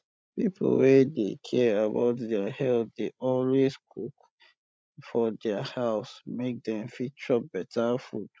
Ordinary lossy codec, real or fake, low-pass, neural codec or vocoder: none; fake; none; codec, 16 kHz, 16 kbps, FreqCodec, larger model